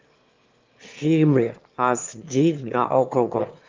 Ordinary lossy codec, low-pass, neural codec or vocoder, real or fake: Opus, 32 kbps; 7.2 kHz; autoencoder, 22.05 kHz, a latent of 192 numbers a frame, VITS, trained on one speaker; fake